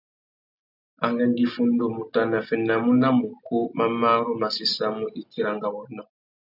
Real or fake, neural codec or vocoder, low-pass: real; none; 5.4 kHz